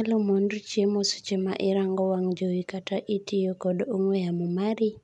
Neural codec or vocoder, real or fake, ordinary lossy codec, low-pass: none; real; none; 10.8 kHz